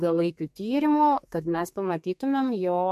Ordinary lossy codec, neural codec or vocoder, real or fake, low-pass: MP3, 64 kbps; codec, 32 kHz, 1.9 kbps, SNAC; fake; 14.4 kHz